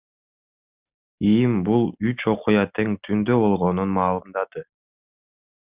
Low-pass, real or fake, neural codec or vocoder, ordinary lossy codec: 3.6 kHz; real; none; Opus, 64 kbps